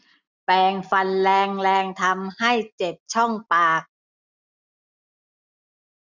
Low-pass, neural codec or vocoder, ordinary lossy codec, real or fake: 7.2 kHz; none; none; real